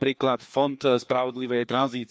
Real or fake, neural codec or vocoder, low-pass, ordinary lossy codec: fake; codec, 16 kHz, 2 kbps, FreqCodec, larger model; none; none